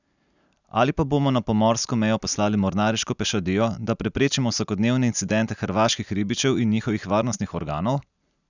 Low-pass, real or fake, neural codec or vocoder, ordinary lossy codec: 7.2 kHz; real; none; none